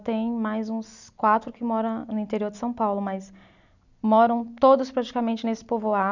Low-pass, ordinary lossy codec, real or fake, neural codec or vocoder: 7.2 kHz; none; real; none